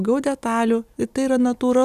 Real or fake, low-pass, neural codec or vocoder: real; 14.4 kHz; none